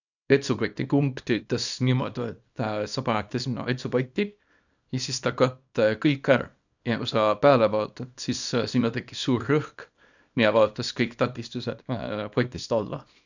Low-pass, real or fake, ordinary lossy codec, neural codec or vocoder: 7.2 kHz; fake; none; codec, 24 kHz, 0.9 kbps, WavTokenizer, small release